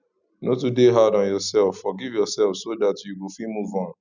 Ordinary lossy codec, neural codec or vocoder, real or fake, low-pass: none; none; real; 7.2 kHz